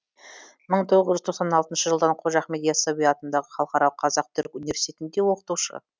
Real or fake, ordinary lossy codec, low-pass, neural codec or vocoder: real; none; none; none